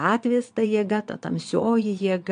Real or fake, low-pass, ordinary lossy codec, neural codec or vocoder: fake; 9.9 kHz; MP3, 64 kbps; vocoder, 22.05 kHz, 80 mel bands, Vocos